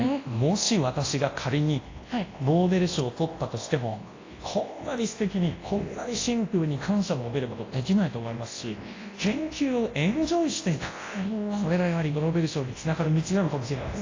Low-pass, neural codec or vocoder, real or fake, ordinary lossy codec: 7.2 kHz; codec, 24 kHz, 0.9 kbps, WavTokenizer, large speech release; fake; AAC, 32 kbps